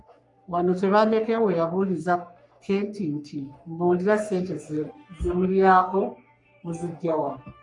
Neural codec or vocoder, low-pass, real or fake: codec, 44.1 kHz, 3.4 kbps, Pupu-Codec; 10.8 kHz; fake